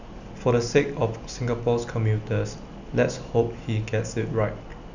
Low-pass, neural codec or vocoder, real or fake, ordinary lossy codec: 7.2 kHz; none; real; none